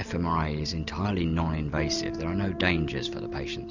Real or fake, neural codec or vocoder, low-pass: real; none; 7.2 kHz